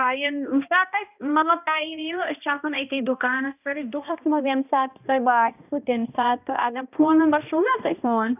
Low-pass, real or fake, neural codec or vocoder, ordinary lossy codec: 3.6 kHz; fake; codec, 16 kHz, 1 kbps, X-Codec, HuBERT features, trained on balanced general audio; none